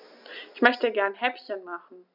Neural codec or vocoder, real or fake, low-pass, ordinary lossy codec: none; real; 5.4 kHz; none